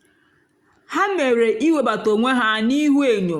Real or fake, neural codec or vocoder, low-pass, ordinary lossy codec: real; none; 19.8 kHz; Opus, 64 kbps